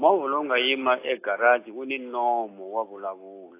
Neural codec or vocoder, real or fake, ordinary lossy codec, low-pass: none; real; AAC, 24 kbps; 3.6 kHz